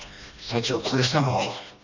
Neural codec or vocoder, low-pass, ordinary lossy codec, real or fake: codec, 16 kHz, 1 kbps, FreqCodec, smaller model; 7.2 kHz; none; fake